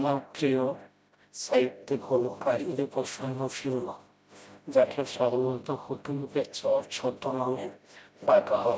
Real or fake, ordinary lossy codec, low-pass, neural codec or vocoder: fake; none; none; codec, 16 kHz, 0.5 kbps, FreqCodec, smaller model